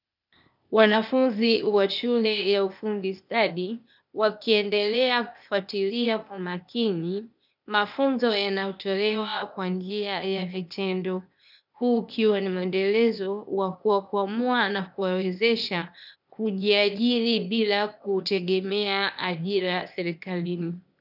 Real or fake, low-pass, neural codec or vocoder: fake; 5.4 kHz; codec, 16 kHz, 0.8 kbps, ZipCodec